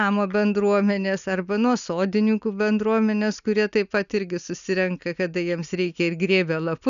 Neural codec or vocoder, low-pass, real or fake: none; 7.2 kHz; real